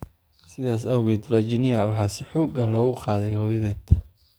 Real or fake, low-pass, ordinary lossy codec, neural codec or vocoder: fake; none; none; codec, 44.1 kHz, 2.6 kbps, SNAC